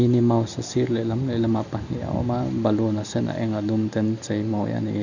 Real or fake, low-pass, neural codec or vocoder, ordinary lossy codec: real; 7.2 kHz; none; none